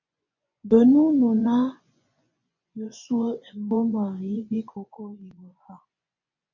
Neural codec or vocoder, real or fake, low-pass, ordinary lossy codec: vocoder, 24 kHz, 100 mel bands, Vocos; fake; 7.2 kHz; MP3, 48 kbps